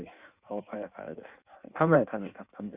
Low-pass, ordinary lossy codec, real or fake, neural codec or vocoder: 3.6 kHz; Opus, 64 kbps; fake; codec, 16 kHz in and 24 kHz out, 1.1 kbps, FireRedTTS-2 codec